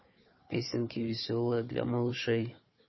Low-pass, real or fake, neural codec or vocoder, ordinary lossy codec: 7.2 kHz; fake; codec, 16 kHz, 4 kbps, FunCodec, trained on Chinese and English, 50 frames a second; MP3, 24 kbps